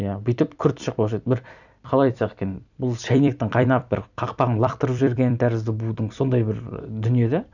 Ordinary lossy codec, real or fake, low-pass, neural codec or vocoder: none; fake; 7.2 kHz; vocoder, 44.1 kHz, 128 mel bands every 256 samples, BigVGAN v2